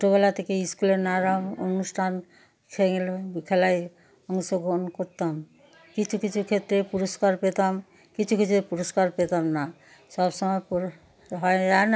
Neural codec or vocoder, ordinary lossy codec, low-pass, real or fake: none; none; none; real